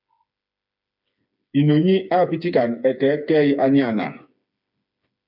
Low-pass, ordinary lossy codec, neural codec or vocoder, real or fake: 5.4 kHz; MP3, 48 kbps; codec, 16 kHz, 4 kbps, FreqCodec, smaller model; fake